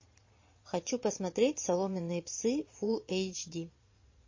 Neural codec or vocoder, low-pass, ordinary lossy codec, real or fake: vocoder, 22.05 kHz, 80 mel bands, Vocos; 7.2 kHz; MP3, 32 kbps; fake